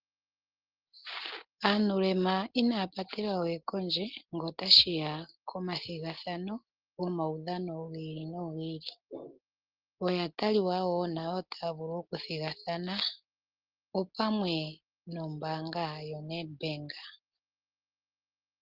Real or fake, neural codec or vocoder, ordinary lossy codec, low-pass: real; none; Opus, 16 kbps; 5.4 kHz